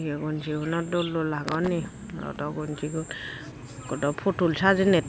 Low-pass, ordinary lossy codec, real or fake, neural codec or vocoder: none; none; real; none